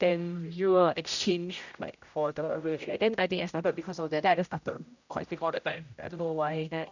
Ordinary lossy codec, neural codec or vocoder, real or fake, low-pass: none; codec, 16 kHz, 0.5 kbps, X-Codec, HuBERT features, trained on general audio; fake; 7.2 kHz